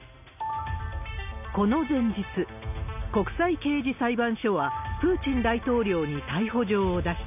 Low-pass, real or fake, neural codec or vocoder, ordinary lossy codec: 3.6 kHz; real; none; none